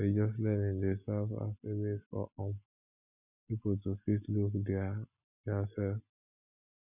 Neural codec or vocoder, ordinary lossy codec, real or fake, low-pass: none; none; real; 3.6 kHz